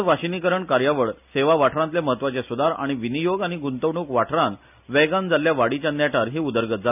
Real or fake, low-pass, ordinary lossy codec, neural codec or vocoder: real; 3.6 kHz; none; none